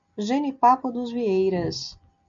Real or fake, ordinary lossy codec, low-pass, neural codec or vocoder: real; MP3, 64 kbps; 7.2 kHz; none